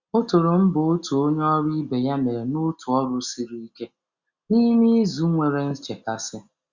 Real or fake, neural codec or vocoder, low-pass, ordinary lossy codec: real; none; 7.2 kHz; Opus, 64 kbps